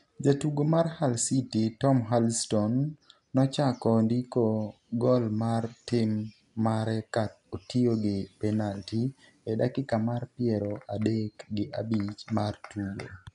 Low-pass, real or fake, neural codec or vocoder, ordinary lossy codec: 10.8 kHz; real; none; none